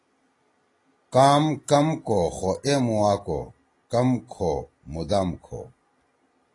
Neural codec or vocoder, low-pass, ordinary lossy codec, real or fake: none; 10.8 kHz; AAC, 32 kbps; real